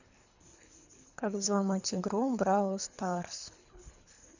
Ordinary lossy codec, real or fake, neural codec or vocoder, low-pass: none; fake; codec, 24 kHz, 3 kbps, HILCodec; 7.2 kHz